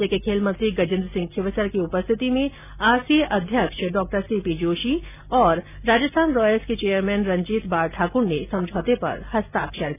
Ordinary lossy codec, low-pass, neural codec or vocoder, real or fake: none; 3.6 kHz; none; real